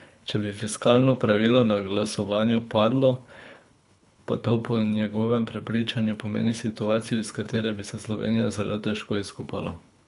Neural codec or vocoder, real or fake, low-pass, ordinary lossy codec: codec, 24 kHz, 3 kbps, HILCodec; fake; 10.8 kHz; none